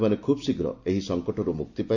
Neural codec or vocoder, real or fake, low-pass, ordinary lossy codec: vocoder, 44.1 kHz, 128 mel bands every 256 samples, BigVGAN v2; fake; 7.2 kHz; none